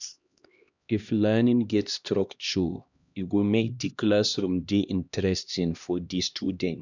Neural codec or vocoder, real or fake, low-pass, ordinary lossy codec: codec, 16 kHz, 1 kbps, X-Codec, HuBERT features, trained on LibriSpeech; fake; 7.2 kHz; none